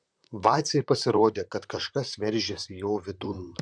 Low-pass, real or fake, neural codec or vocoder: 9.9 kHz; fake; vocoder, 44.1 kHz, 128 mel bands, Pupu-Vocoder